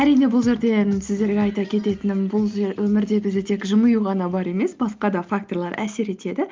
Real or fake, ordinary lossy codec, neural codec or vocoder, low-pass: real; Opus, 32 kbps; none; 7.2 kHz